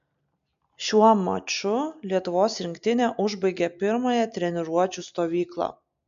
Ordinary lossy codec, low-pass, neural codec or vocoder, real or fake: MP3, 64 kbps; 7.2 kHz; none; real